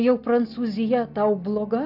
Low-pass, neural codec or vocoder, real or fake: 5.4 kHz; none; real